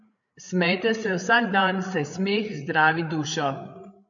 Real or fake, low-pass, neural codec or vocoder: fake; 7.2 kHz; codec, 16 kHz, 8 kbps, FreqCodec, larger model